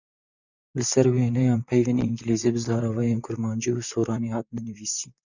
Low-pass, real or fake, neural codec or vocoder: 7.2 kHz; fake; vocoder, 44.1 kHz, 128 mel bands, Pupu-Vocoder